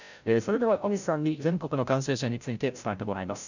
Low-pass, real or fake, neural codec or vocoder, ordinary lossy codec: 7.2 kHz; fake; codec, 16 kHz, 0.5 kbps, FreqCodec, larger model; none